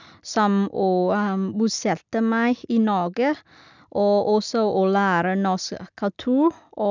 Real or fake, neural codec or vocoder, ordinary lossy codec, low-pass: real; none; none; 7.2 kHz